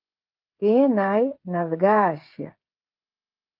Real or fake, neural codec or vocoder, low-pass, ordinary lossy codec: fake; codec, 16 kHz, 16 kbps, FreqCodec, smaller model; 5.4 kHz; Opus, 32 kbps